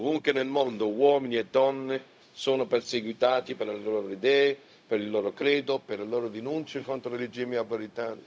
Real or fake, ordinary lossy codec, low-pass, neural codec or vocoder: fake; none; none; codec, 16 kHz, 0.4 kbps, LongCat-Audio-Codec